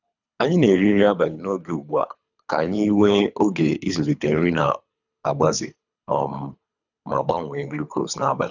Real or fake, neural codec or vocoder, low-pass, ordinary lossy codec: fake; codec, 24 kHz, 3 kbps, HILCodec; 7.2 kHz; none